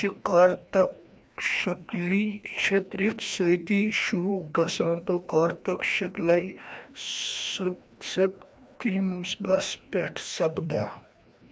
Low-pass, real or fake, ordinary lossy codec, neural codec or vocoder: none; fake; none; codec, 16 kHz, 1 kbps, FreqCodec, larger model